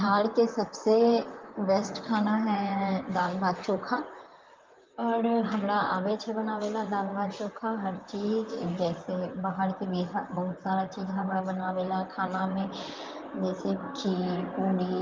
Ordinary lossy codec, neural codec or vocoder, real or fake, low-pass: Opus, 32 kbps; vocoder, 44.1 kHz, 128 mel bands, Pupu-Vocoder; fake; 7.2 kHz